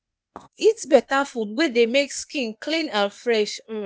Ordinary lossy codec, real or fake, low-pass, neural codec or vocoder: none; fake; none; codec, 16 kHz, 0.8 kbps, ZipCodec